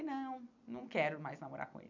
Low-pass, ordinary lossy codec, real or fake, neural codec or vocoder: 7.2 kHz; none; fake; vocoder, 44.1 kHz, 128 mel bands every 256 samples, BigVGAN v2